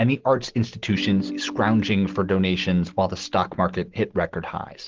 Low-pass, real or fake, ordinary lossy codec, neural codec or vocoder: 7.2 kHz; real; Opus, 16 kbps; none